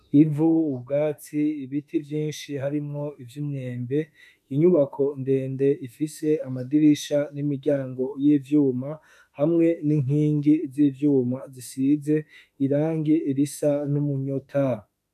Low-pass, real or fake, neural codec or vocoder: 14.4 kHz; fake; autoencoder, 48 kHz, 32 numbers a frame, DAC-VAE, trained on Japanese speech